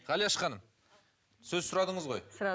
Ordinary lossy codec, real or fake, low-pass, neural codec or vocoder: none; real; none; none